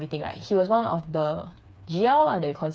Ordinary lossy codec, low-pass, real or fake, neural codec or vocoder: none; none; fake; codec, 16 kHz, 4 kbps, FreqCodec, smaller model